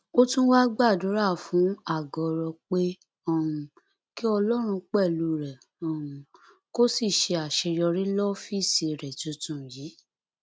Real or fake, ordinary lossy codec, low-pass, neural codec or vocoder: real; none; none; none